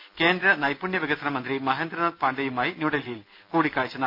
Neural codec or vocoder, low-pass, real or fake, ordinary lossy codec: none; 5.4 kHz; real; none